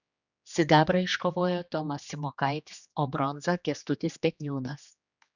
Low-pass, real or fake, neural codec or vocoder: 7.2 kHz; fake; codec, 16 kHz, 2 kbps, X-Codec, HuBERT features, trained on general audio